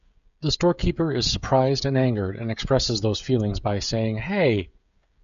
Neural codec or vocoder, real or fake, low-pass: codec, 16 kHz, 16 kbps, FreqCodec, smaller model; fake; 7.2 kHz